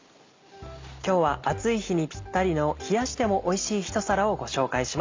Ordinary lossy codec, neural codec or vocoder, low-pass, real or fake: MP3, 64 kbps; none; 7.2 kHz; real